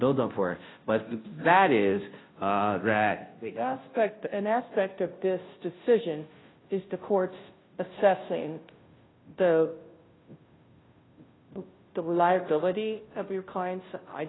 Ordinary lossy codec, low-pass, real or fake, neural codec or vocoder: AAC, 16 kbps; 7.2 kHz; fake; codec, 16 kHz, 0.5 kbps, FunCodec, trained on Chinese and English, 25 frames a second